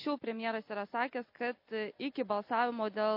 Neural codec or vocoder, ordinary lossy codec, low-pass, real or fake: none; MP3, 32 kbps; 5.4 kHz; real